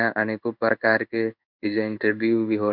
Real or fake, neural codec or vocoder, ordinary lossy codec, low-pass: fake; codec, 16 kHz in and 24 kHz out, 1 kbps, XY-Tokenizer; none; 5.4 kHz